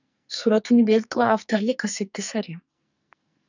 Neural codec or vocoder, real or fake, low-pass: codec, 44.1 kHz, 2.6 kbps, SNAC; fake; 7.2 kHz